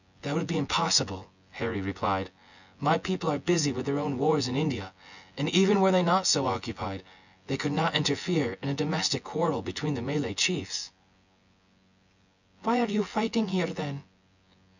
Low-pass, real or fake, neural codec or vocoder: 7.2 kHz; fake; vocoder, 24 kHz, 100 mel bands, Vocos